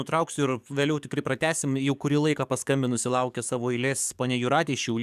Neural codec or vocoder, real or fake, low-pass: codec, 44.1 kHz, 7.8 kbps, DAC; fake; 14.4 kHz